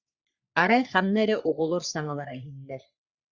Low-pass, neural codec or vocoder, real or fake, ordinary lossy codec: 7.2 kHz; codec, 44.1 kHz, 3.4 kbps, Pupu-Codec; fake; Opus, 64 kbps